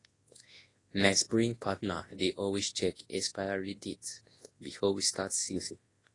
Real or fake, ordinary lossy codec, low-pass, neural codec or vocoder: fake; AAC, 32 kbps; 10.8 kHz; codec, 24 kHz, 0.9 kbps, WavTokenizer, large speech release